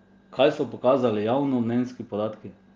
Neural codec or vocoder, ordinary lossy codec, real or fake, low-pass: none; Opus, 32 kbps; real; 7.2 kHz